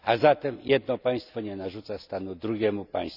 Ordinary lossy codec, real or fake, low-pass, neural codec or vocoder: none; real; 5.4 kHz; none